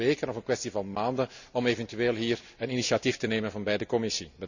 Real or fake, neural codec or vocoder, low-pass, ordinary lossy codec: real; none; 7.2 kHz; none